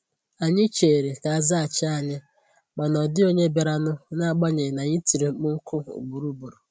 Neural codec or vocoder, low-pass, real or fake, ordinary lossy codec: none; none; real; none